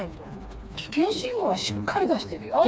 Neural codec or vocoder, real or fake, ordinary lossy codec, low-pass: codec, 16 kHz, 2 kbps, FreqCodec, smaller model; fake; none; none